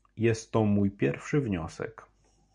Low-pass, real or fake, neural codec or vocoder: 9.9 kHz; real; none